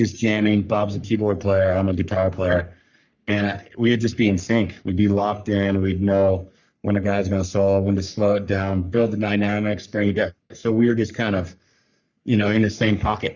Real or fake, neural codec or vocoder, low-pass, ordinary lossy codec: fake; codec, 44.1 kHz, 3.4 kbps, Pupu-Codec; 7.2 kHz; Opus, 64 kbps